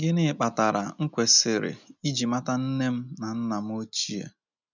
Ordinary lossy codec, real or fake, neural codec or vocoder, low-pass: none; real; none; 7.2 kHz